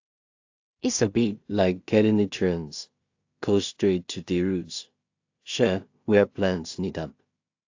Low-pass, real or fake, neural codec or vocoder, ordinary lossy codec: 7.2 kHz; fake; codec, 16 kHz in and 24 kHz out, 0.4 kbps, LongCat-Audio-Codec, two codebook decoder; AAC, 48 kbps